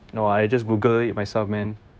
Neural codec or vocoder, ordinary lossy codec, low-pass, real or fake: codec, 16 kHz, 0.9 kbps, LongCat-Audio-Codec; none; none; fake